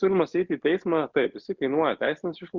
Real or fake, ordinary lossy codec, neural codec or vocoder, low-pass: real; Opus, 64 kbps; none; 7.2 kHz